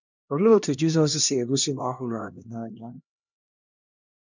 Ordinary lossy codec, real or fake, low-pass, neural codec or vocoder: none; fake; 7.2 kHz; codec, 16 kHz, 1 kbps, X-Codec, HuBERT features, trained on LibriSpeech